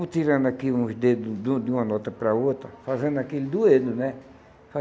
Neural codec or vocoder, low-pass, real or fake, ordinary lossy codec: none; none; real; none